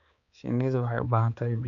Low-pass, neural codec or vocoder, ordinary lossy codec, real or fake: 7.2 kHz; codec, 16 kHz, 4 kbps, X-Codec, HuBERT features, trained on balanced general audio; none; fake